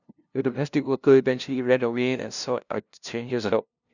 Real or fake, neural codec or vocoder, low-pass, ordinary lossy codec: fake; codec, 16 kHz, 0.5 kbps, FunCodec, trained on LibriTTS, 25 frames a second; 7.2 kHz; none